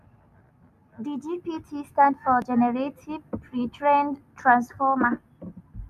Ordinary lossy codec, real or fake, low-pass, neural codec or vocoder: none; real; 14.4 kHz; none